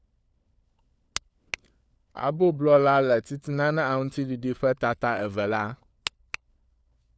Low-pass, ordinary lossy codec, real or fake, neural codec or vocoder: none; none; fake; codec, 16 kHz, 4 kbps, FunCodec, trained on LibriTTS, 50 frames a second